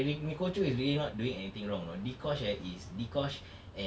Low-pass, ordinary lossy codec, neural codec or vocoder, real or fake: none; none; none; real